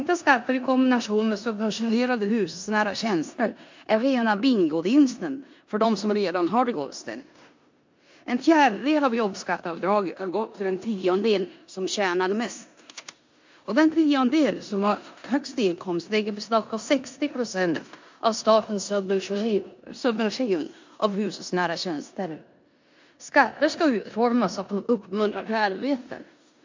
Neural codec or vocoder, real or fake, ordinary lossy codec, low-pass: codec, 16 kHz in and 24 kHz out, 0.9 kbps, LongCat-Audio-Codec, four codebook decoder; fake; MP3, 48 kbps; 7.2 kHz